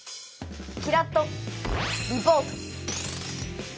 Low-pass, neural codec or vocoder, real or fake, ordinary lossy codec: none; none; real; none